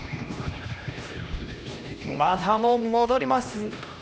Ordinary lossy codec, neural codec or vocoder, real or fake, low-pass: none; codec, 16 kHz, 1 kbps, X-Codec, HuBERT features, trained on LibriSpeech; fake; none